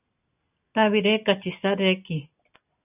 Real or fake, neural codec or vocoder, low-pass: real; none; 3.6 kHz